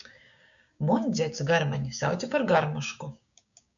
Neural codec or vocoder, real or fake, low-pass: codec, 16 kHz, 6 kbps, DAC; fake; 7.2 kHz